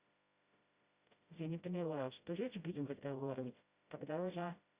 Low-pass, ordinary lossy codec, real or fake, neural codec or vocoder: 3.6 kHz; Opus, 64 kbps; fake; codec, 16 kHz, 0.5 kbps, FreqCodec, smaller model